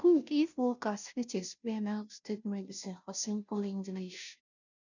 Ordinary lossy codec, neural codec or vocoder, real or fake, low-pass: none; codec, 16 kHz, 0.5 kbps, FunCodec, trained on Chinese and English, 25 frames a second; fake; 7.2 kHz